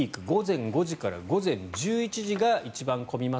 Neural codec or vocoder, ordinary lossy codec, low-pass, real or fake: none; none; none; real